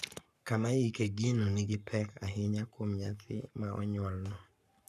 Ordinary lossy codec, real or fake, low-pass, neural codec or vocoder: none; fake; 14.4 kHz; codec, 44.1 kHz, 7.8 kbps, Pupu-Codec